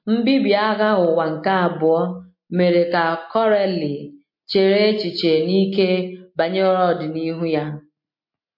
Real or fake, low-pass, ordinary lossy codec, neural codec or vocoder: real; 5.4 kHz; MP3, 32 kbps; none